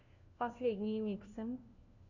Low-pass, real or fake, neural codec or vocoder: 7.2 kHz; fake; codec, 16 kHz, 1 kbps, FunCodec, trained on LibriTTS, 50 frames a second